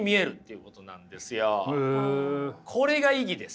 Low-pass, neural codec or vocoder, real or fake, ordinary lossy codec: none; none; real; none